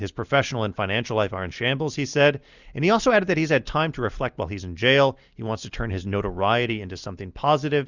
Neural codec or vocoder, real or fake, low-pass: none; real; 7.2 kHz